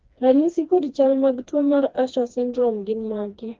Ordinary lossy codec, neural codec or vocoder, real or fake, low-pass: Opus, 32 kbps; codec, 16 kHz, 2 kbps, FreqCodec, smaller model; fake; 7.2 kHz